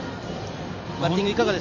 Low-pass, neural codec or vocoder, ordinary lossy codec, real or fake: 7.2 kHz; vocoder, 44.1 kHz, 128 mel bands every 256 samples, BigVGAN v2; none; fake